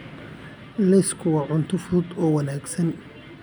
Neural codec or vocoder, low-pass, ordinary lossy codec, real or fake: none; none; none; real